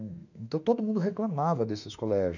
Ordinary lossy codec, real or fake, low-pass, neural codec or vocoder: none; fake; 7.2 kHz; autoencoder, 48 kHz, 32 numbers a frame, DAC-VAE, trained on Japanese speech